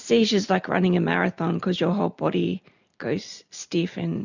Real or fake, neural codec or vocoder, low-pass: real; none; 7.2 kHz